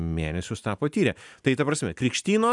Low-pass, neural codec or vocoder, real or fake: 10.8 kHz; none; real